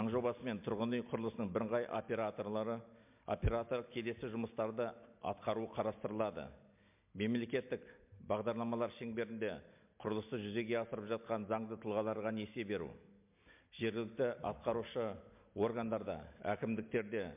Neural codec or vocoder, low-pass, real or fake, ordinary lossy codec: none; 3.6 kHz; real; MP3, 32 kbps